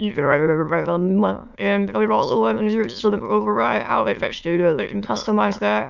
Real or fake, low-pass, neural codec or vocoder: fake; 7.2 kHz; autoencoder, 22.05 kHz, a latent of 192 numbers a frame, VITS, trained on many speakers